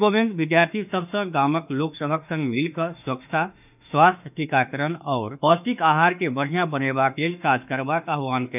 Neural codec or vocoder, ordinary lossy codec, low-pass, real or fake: autoencoder, 48 kHz, 32 numbers a frame, DAC-VAE, trained on Japanese speech; none; 3.6 kHz; fake